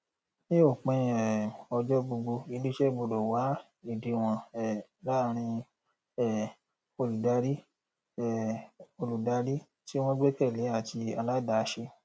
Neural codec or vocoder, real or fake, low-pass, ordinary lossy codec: none; real; none; none